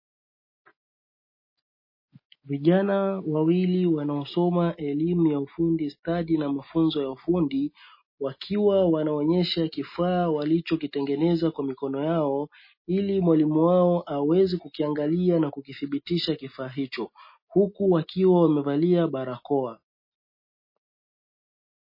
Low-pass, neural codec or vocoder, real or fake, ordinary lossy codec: 5.4 kHz; none; real; MP3, 24 kbps